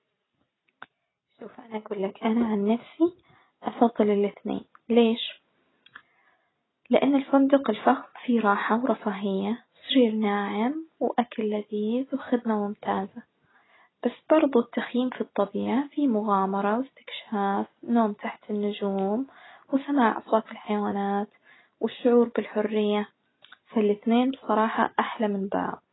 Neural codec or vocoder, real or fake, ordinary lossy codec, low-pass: none; real; AAC, 16 kbps; 7.2 kHz